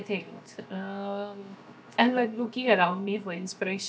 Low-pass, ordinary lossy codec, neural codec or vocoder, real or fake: none; none; codec, 16 kHz, 0.7 kbps, FocalCodec; fake